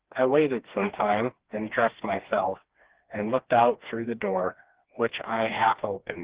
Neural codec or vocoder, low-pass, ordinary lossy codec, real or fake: codec, 16 kHz, 2 kbps, FreqCodec, smaller model; 3.6 kHz; Opus, 16 kbps; fake